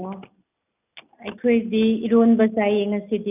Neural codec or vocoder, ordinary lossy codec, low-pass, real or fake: none; none; 3.6 kHz; real